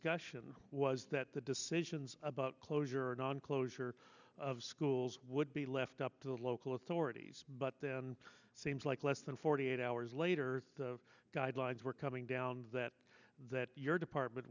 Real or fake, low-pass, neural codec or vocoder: real; 7.2 kHz; none